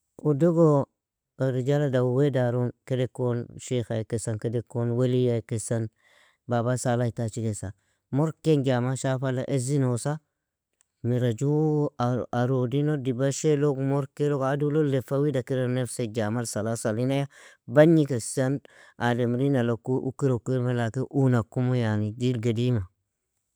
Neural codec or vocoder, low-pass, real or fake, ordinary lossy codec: none; none; real; none